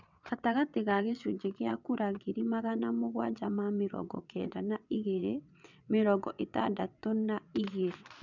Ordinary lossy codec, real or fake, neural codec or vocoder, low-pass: none; fake; vocoder, 22.05 kHz, 80 mel bands, WaveNeXt; 7.2 kHz